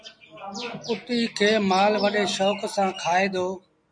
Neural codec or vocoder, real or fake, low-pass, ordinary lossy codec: none; real; 9.9 kHz; MP3, 96 kbps